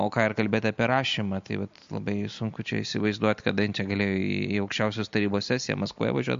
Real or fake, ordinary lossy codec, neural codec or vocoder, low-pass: real; MP3, 48 kbps; none; 7.2 kHz